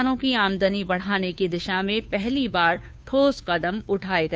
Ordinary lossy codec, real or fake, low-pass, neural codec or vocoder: none; fake; none; codec, 16 kHz, 2 kbps, FunCodec, trained on Chinese and English, 25 frames a second